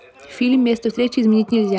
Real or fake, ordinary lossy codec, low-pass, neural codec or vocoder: real; none; none; none